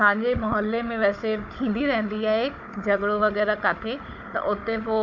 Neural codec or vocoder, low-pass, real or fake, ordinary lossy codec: codec, 16 kHz, 16 kbps, FunCodec, trained on Chinese and English, 50 frames a second; 7.2 kHz; fake; MP3, 64 kbps